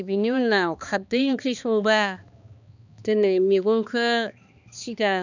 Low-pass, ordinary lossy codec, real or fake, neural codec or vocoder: 7.2 kHz; none; fake; codec, 16 kHz, 2 kbps, X-Codec, HuBERT features, trained on balanced general audio